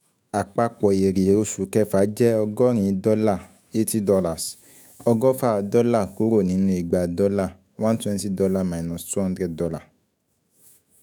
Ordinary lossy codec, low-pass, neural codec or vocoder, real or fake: none; none; autoencoder, 48 kHz, 128 numbers a frame, DAC-VAE, trained on Japanese speech; fake